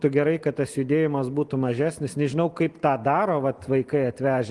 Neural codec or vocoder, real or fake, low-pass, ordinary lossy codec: none; real; 10.8 kHz; Opus, 24 kbps